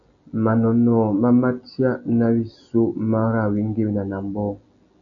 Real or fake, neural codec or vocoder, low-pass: real; none; 7.2 kHz